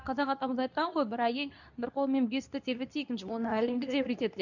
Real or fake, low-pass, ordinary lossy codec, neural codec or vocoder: fake; 7.2 kHz; none; codec, 24 kHz, 0.9 kbps, WavTokenizer, medium speech release version 2